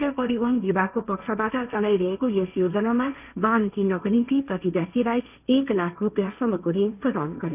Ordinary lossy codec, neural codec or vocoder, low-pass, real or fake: none; codec, 16 kHz, 1.1 kbps, Voila-Tokenizer; 3.6 kHz; fake